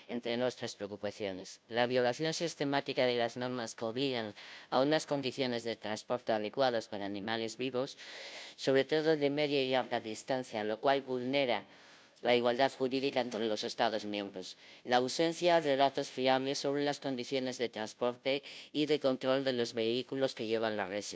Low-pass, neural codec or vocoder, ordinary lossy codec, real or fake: none; codec, 16 kHz, 0.5 kbps, FunCodec, trained on Chinese and English, 25 frames a second; none; fake